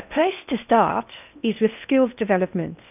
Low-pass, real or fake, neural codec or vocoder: 3.6 kHz; fake; codec, 16 kHz in and 24 kHz out, 0.6 kbps, FocalCodec, streaming, 4096 codes